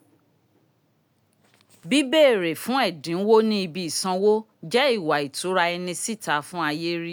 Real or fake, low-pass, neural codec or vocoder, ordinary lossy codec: real; none; none; none